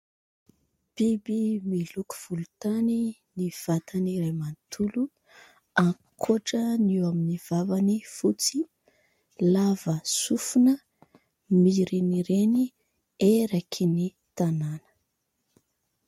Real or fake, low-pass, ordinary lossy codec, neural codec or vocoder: real; 19.8 kHz; MP3, 64 kbps; none